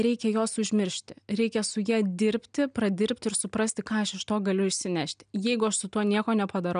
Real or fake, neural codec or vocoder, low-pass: real; none; 9.9 kHz